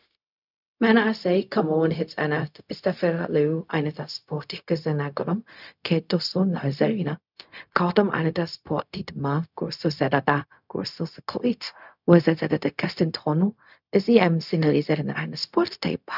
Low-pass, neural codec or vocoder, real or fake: 5.4 kHz; codec, 16 kHz, 0.4 kbps, LongCat-Audio-Codec; fake